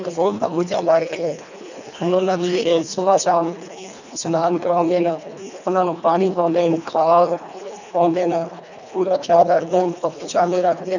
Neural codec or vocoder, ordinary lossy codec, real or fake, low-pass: codec, 24 kHz, 1.5 kbps, HILCodec; none; fake; 7.2 kHz